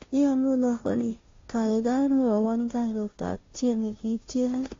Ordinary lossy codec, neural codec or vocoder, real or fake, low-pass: AAC, 32 kbps; codec, 16 kHz, 0.5 kbps, FunCodec, trained on Chinese and English, 25 frames a second; fake; 7.2 kHz